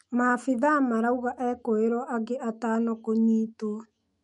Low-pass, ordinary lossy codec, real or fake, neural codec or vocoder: 19.8 kHz; MP3, 48 kbps; fake; autoencoder, 48 kHz, 128 numbers a frame, DAC-VAE, trained on Japanese speech